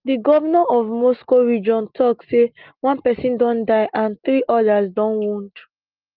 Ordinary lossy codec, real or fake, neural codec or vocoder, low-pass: Opus, 24 kbps; real; none; 5.4 kHz